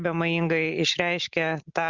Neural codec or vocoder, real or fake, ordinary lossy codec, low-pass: none; real; Opus, 64 kbps; 7.2 kHz